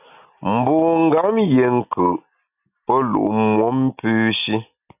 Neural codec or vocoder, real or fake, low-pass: none; real; 3.6 kHz